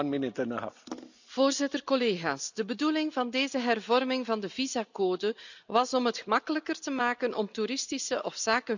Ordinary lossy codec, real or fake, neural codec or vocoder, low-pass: none; real; none; 7.2 kHz